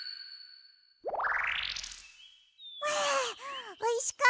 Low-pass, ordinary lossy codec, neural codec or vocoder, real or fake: none; none; none; real